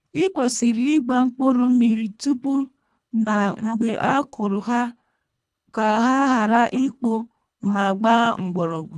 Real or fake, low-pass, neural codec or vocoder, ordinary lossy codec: fake; none; codec, 24 kHz, 1.5 kbps, HILCodec; none